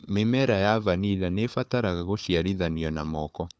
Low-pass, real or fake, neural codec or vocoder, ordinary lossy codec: none; fake; codec, 16 kHz, 4 kbps, FunCodec, trained on Chinese and English, 50 frames a second; none